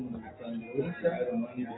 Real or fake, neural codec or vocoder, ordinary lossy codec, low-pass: real; none; AAC, 16 kbps; 7.2 kHz